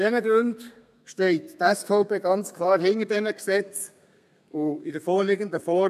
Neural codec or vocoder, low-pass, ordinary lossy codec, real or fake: codec, 32 kHz, 1.9 kbps, SNAC; 14.4 kHz; none; fake